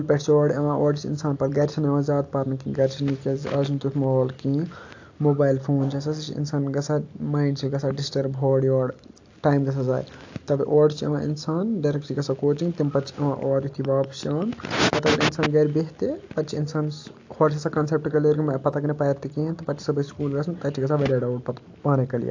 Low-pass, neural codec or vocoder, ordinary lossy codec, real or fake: 7.2 kHz; none; AAC, 48 kbps; real